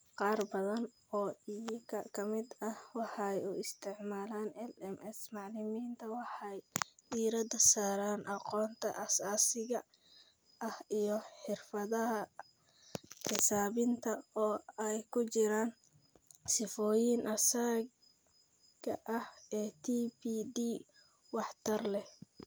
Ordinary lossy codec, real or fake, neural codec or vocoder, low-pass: none; real; none; none